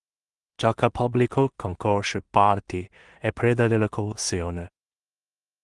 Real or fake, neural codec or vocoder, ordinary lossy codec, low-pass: fake; codec, 16 kHz in and 24 kHz out, 0.4 kbps, LongCat-Audio-Codec, two codebook decoder; Opus, 24 kbps; 10.8 kHz